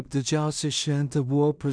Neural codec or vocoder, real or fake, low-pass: codec, 16 kHz in and 24 kHz out, 0.4 kbps, LongCat-Audio-Codec, two codebook decoder; fake; 9.9 kHz